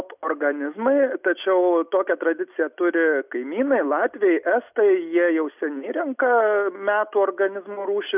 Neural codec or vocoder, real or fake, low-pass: none; real; 3.6 kHz